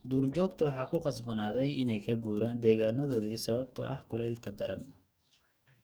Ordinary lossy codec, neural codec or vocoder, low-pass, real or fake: none; codec, 44.1 kHz, 2.6 kbps, DAC; none; fake